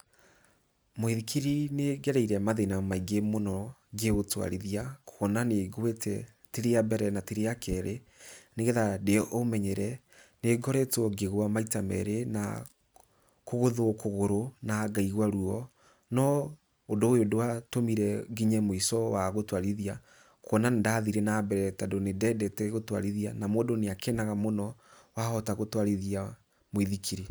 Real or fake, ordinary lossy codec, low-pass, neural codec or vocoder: real; none; none; none